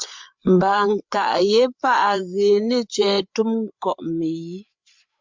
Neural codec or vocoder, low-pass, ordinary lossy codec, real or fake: codec, 16 kHz, 8 kbps, FreqCodec, larger model; 7.2 kHz; MP3, 64 kbps; fake